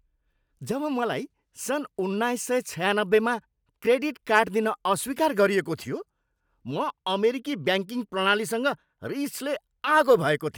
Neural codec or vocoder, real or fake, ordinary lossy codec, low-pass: none; real; none; none